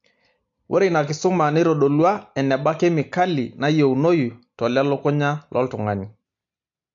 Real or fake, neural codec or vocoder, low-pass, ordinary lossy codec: real; none; 7.2 kHz; AAC, 64 kbps